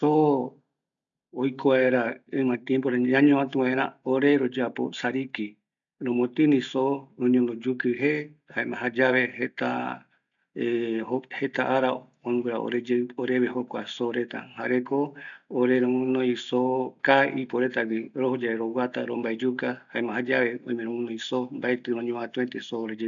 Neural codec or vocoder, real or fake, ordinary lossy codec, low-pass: none; real; none; 7.2 kHz